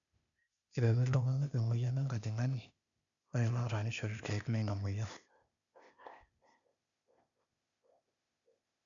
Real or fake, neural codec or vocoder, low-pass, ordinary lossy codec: fake; codec, 16 kHz, 0.8 kbps, ZipCodec; 7.2 kHz; none